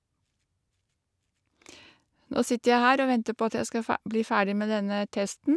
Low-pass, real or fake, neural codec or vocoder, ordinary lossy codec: none; real; none; none